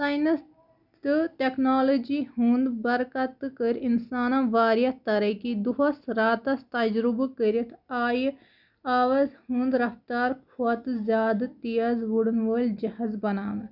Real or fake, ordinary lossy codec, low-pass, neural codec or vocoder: real; none; 5.4 kHz; none